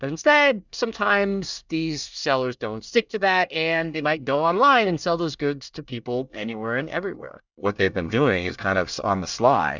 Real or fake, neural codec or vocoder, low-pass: fake; codec, 24 kHz, 1 kbps, SNAC; 7.2 kHz